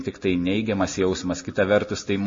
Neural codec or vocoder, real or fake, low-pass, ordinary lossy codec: none; real; 7.2 kHz; MP3, 32 kbps